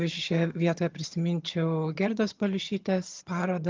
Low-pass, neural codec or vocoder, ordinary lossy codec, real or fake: 7.2 kHz; vocoder, 22.05 kHz, 80 mel bands, HiFi-GAN; Opus, 32 kbps; fake